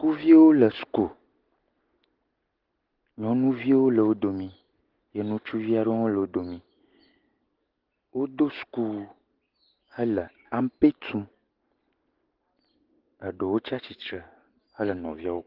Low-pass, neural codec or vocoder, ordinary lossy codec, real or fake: 5.4 kHz; none; Opus, 16 kbps; real